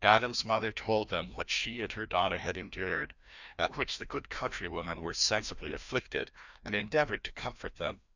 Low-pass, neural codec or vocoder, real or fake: 7.2 kHz; codec, 16 kHz, 1 kbps, FreqCodec, larger model; fake